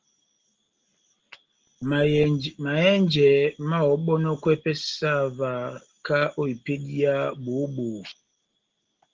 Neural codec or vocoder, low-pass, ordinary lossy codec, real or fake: none; 7.2 kHz; Opus, 16 kbps; real